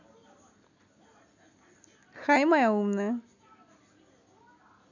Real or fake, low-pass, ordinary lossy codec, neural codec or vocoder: real; 7.2 kHz; none; none